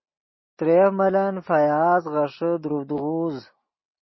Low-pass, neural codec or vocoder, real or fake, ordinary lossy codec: 7.2 kHz; none; real; MP3, 24 kbps